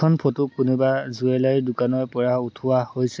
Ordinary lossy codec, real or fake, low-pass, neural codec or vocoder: none; real; none; none